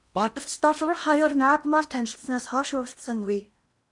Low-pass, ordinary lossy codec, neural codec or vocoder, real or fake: 10.8 kHz; MP3, 96 kbps; codec, 16 kHz in and 24 kHz out, 0.8 kbps, FocalCodec, streaming, 65536 codes; fake